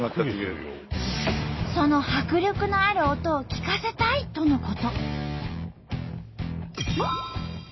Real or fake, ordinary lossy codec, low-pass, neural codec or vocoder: real; MP3, 24 kbps; 7.2 kHz; none